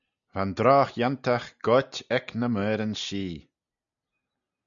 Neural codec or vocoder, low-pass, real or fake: none; 7.2 kHz; real